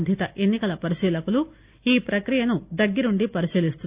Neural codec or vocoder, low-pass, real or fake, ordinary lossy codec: none; 3.6 kHz; real; Opus, 64 kbps